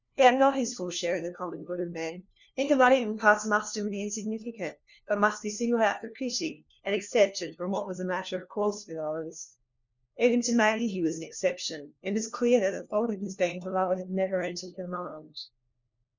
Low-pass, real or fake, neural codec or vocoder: 7.2 kHz; fake; codec, 16 kHz, 1 kbps, FunCodec, trained on LibriTTS, 50 frames a second